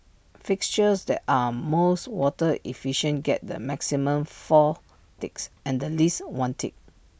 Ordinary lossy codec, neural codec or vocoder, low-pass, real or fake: none; none; none; real